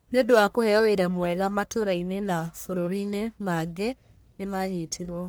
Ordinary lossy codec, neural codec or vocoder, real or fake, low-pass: none; codec, 44.1 kHz, 1.7 kbps, Pupu-Codec; fake; none